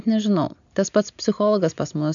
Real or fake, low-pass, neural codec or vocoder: real; 7.2 kHz; none